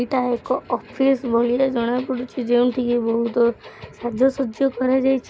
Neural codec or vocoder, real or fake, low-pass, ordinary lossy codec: none; real; none; none